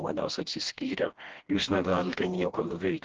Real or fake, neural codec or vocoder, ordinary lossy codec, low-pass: fake; codec, 16 kHz, 1 kbps, FreqCodec, smaller model; Opus, 16 kbps; 7.2 kHz